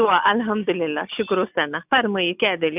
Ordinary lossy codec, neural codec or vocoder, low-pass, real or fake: none; none; 3.6 kHz; real